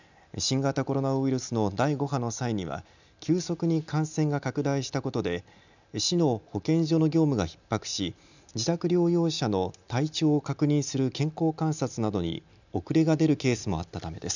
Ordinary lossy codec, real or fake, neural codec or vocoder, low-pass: none; real; none; 7.2 kHz